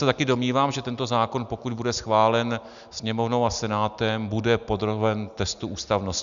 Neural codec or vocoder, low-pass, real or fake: none; 7.2 kHz; real